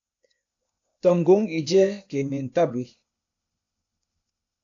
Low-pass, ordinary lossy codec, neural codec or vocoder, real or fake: 7.2 kHz; AAC, 64 kbps; codec, 16 kHz, 0.8 kbps, ZipCodec; fake